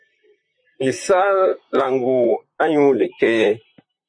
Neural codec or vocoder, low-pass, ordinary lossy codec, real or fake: vocoder, 44.1 kHz, 128 mel bands, Pupu-Vocoder; 9.9 kHz; MP3, 64 kbps; fake